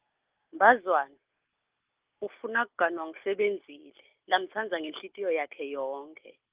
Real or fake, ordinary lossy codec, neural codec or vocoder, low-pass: real; Opus, 24 kbps; none; 3.6 kHz